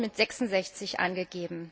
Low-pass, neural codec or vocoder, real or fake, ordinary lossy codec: none; none; real; none